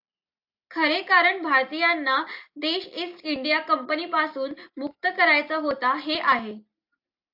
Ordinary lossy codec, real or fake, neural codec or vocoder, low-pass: AAC, 48 kbps; real; none; 5.4 kHz